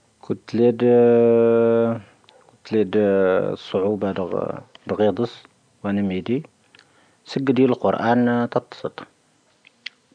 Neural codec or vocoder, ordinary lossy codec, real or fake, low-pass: none; none; real; 9.9 kHz